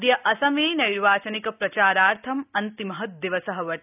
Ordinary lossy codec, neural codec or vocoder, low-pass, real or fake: none; none; 3.6 kHz; real